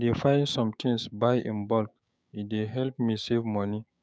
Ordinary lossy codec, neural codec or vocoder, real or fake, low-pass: none; codec, 16 kHz, 6 kbps, DAC; fake; none